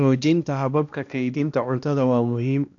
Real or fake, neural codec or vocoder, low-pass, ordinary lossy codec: fake; codec, 16 kHz, 1 kbps, X-Codec, HuBERT features, trained on balanced general audio; 7.2 kHz; AAC, 48 kbps